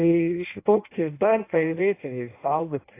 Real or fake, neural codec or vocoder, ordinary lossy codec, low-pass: fake; codec, 16 kHz in and 24 kHz out, 0.6 kbps, FireRedTTS-2 codec; AAC, 24 kbps; 3.6 kHz